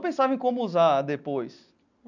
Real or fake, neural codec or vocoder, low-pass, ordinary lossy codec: real; none; 7.2 kHz; none